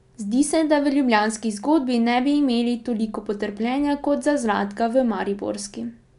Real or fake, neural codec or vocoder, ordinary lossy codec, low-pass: real; none; none; 10.8 kHz